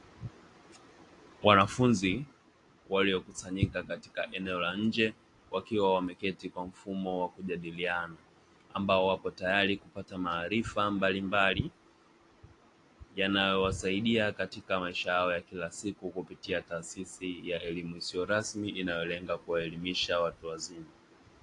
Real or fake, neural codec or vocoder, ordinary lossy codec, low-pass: real; none; AAC, 48 kbps; 10.8 kHz